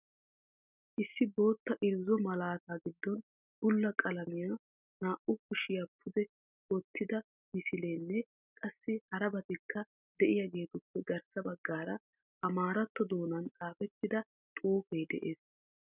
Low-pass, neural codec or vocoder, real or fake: 3.6 kHz; none; real